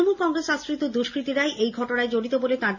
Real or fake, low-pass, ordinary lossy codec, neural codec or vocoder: real; 7.2 kHz; none; none